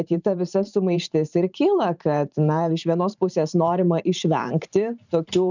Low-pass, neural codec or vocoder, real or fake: 7.2 kHz; vocoder, 44.1 kHz, 128 mel bands every 512 samples, BigVGAN v2; fake